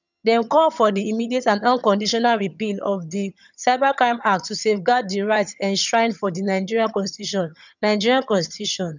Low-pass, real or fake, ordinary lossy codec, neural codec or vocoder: 7.2 kHz; fake; none; vocoder, 22.05 kHz, 80 mel bands, HiFi-GAN